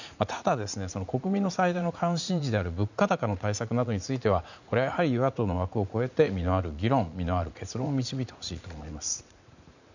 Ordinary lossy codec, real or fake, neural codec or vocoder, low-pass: none; fake; vocoder, 44.1 kHz, 80 mel bands, Vocos; 7.2 kHz